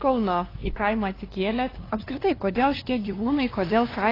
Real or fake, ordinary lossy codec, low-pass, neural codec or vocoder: fake; AAC, 24 kbps; 5.4 kHz; codec, 16 kHz, 2 kbps, FunCodec, trained on LibriTTS, 25 frames a second